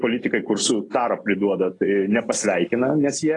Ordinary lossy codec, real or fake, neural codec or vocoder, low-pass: AAC, 32 kbps; real; none; 10.8 kHz